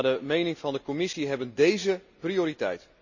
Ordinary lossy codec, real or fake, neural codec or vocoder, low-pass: none; real; none; 7.2 kHz